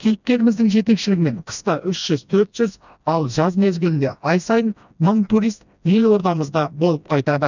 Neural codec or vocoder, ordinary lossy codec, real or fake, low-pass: codec, 16 kHz, 1 kbps, FreqCodec, smaller model; none; fake; 7.2 kHz